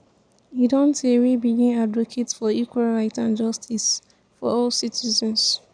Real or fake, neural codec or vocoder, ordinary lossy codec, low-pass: real; none; none; 9.9 kHz